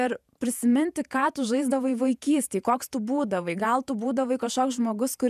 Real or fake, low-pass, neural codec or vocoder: fake; 14.4 kHz; vocoder, 48 kHz, 128 mel bands, Vocos